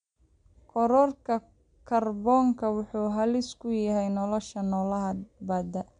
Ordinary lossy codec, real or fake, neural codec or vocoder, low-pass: MP3, 64 kbps; real; none; 9.9 kHz